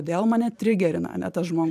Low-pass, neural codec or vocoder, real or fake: 14.4 kHz; vocoder, 44.1 kHz, 128 mel bands every 512 samples, BigVGAN v2; fake